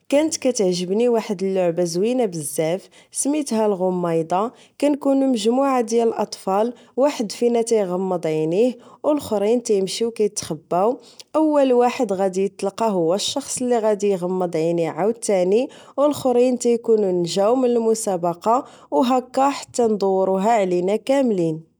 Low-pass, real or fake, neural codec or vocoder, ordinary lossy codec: none; real; none; none